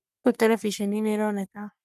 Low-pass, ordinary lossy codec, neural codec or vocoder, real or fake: 14.4 kHz; none; codec, 44.1 kHz, 3.4 kbps, Pupu-Codec; fake